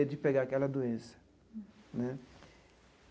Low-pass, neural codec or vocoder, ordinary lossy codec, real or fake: none; none; none; real